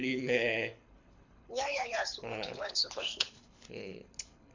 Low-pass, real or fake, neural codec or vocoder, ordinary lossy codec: 7.2 kHz; fake; codec, 24 kHz, 6 kbps, HILCodec; MP3, 64 kbps